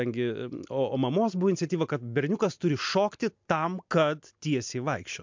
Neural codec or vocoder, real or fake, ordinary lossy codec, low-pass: none; real; MP3, 64 kbps; 7.2 kHz